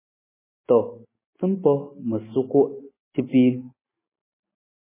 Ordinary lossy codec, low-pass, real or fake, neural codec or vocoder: MP3, 16 kbps; 3.6 kHz; real; none